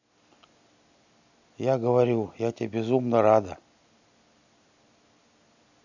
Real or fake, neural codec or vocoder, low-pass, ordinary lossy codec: real; none; 7.2 kHz; none